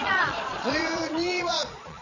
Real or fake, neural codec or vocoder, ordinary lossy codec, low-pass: fake; vocoder, 22.05 kHz, 80 mel bands, Vocos; none; 7.2 kHz